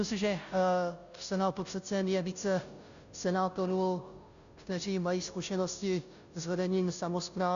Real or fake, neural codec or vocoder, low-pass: fake; codec, 16 kHz, 0.5 kbps, FunCodec, trained on Chinese and English, 25 frames a second; 7.2 kHz